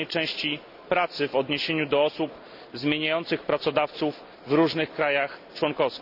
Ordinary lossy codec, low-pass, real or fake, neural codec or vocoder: none; 5.4 kHz; real; none